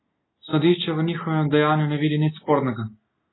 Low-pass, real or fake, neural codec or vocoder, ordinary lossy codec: 7.2 kHz; real; none; AAC, 16 kbps